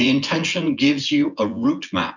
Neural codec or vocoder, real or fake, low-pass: vocoder, 24 kHz, 100 mel bands, Vocos; fake; 7.2 kHz